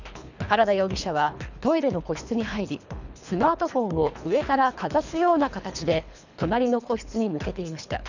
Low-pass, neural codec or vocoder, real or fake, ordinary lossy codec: 7.2 kHz; codec, 24 kHz, 3 kbps, HILCodec; fake; none